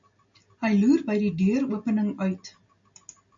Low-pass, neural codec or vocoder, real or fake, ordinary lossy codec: 7.2 kHz; none; real; AAC, 64 kbps